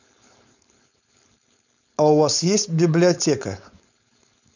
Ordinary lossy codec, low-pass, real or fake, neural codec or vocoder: none; 7.2 kHz; fake; codec, 16 kHz, 4.8 kbps, FACodec